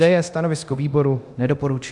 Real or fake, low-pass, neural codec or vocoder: fake; 10.8 kHz; codec, 24 kHz, 0.9 kbps, DualCodec